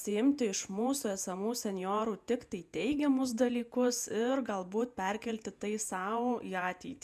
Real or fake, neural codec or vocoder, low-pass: fake; vocoder, 48 kHz, 128 mel bands, Vocos; 14.4 kHz